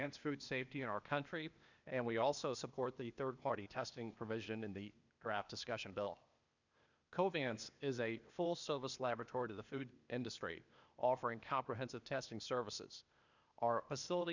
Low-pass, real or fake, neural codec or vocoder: 7.2 kHz; fake; codec, 16 kHz, 0.8 kbps, ZipCodec